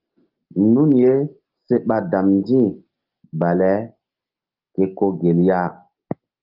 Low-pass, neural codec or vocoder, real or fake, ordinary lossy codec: 5.4 kHz; none; real; Opus, 24 kbps